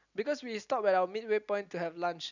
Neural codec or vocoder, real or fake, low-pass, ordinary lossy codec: none; real; 7.2 kHz; none